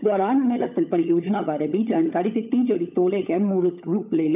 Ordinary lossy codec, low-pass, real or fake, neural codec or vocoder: AAC, 32 kbps; 3.6 kHz; fake; codec, 16 kHz, 16 kbps, FunCodec, trained on LibriTTS, 50 frames a second